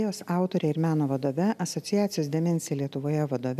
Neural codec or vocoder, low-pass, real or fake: none; 14.4 kHz; real